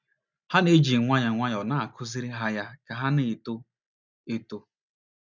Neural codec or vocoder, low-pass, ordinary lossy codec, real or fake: none; 7.2 kHz; none; real